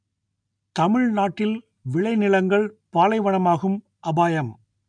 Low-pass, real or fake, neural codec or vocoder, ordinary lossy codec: 9.9 kHz; real; none; MP3, 96 kbps